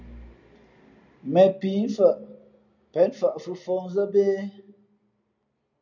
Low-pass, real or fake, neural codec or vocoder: 7.2 kHz; real; none